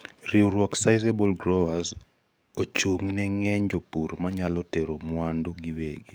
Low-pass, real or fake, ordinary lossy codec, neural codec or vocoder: none; fake; none; codec, 44.1 kHz, 7.8 kbps, DAC